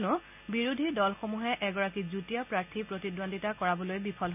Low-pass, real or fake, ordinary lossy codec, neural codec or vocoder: 3.6 kHz; real; none; none